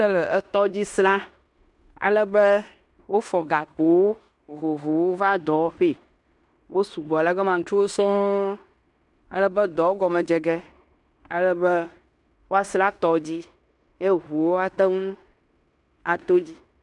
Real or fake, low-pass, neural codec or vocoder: fake; 10.8 kHz; codec, 16 kHz in and 24 kHz out, 0.9 kbps, LongCat-Audio-Codec, fine tuned four codebook decoder